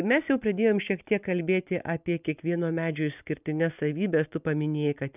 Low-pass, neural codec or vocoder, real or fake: 3.6 kHz; none; real